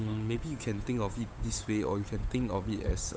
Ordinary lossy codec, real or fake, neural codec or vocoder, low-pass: none; fake; codec, 16 kHz, 8 kbps, FunCodec, trained on Chinese and English, 25 frames a second; none